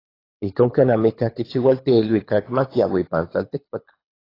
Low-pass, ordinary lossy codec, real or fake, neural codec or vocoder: 5.4 kHz; AAC, 24 kbps; fake; codec, 24 kHz, 6 kbps, HILCodec